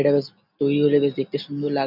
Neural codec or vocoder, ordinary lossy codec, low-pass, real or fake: none; none; 5.4 kHz; real